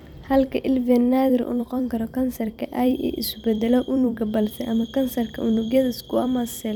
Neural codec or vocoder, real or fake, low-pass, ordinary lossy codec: vocoder, 44.1 kHz, 128 mel bands every 256 samples, BigVGAN v2; fake; 19.8 kHz; none